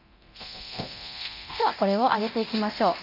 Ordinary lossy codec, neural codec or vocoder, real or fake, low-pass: none; codec, 24 kHz, 0.9 kbps, DualCodec; fake; 5.4 kHz